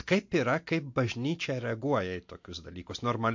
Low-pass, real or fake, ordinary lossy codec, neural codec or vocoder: 7.2 kHz; real; MP3, 48 kbps; none